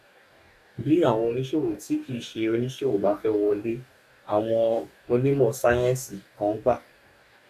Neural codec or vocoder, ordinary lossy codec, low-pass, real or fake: codec, 44.1 kHz, 2.6 kbps, DAC; none; 14.4 kHz; fake